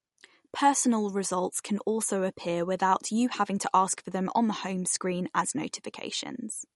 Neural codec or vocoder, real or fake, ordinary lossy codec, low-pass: none; real; MP3, 48 kbps; 19.8 kHz